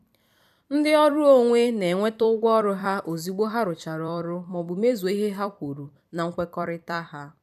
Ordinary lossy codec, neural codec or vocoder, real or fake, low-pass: none; vocoder, 44.1 kHz, 128 mel bands every 256 samples, BigVGAN v2; fake; 14.4 kHz